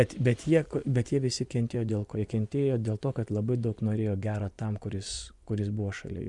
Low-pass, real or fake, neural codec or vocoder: 10.8 kHz; fake; vocoder, 24 kHz, 100 mel bands, Vocos